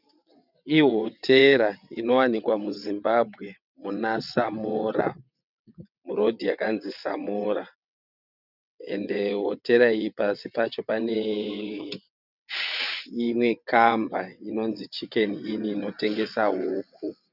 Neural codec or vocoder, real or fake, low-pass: vocoder, 22.05 kHz, 80 mel bands, WaveNeXt; fake; 5.4 kHz